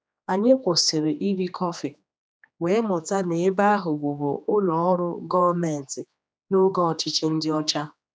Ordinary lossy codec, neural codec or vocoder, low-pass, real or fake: none; codec, 16 kHz, 2 kbps, X-Codec, HuBERT features, trained on general audio; none; fake